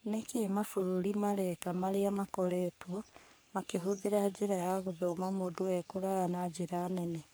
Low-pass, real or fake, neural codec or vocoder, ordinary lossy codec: none; fake; codec, 44.1 kHz, 3.4 kbps, Pupu-Codec; none